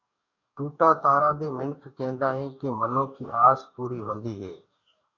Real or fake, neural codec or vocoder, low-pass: fake; codec, 44.1 kHz, 2.6 kbps, DAC; 7.2 kHz